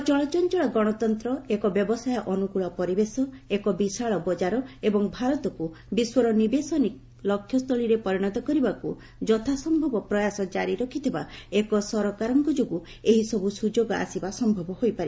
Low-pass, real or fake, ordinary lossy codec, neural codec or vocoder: none; real; none; none